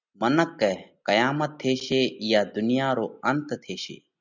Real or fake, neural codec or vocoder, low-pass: real; none; 7.2 kHz